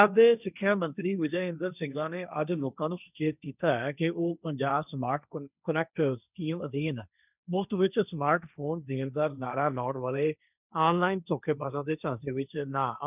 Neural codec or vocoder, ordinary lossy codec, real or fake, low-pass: codec, 16 kHz, 1.1 kbps, Voila-Tokenizer; none; fake; 3.6 kHz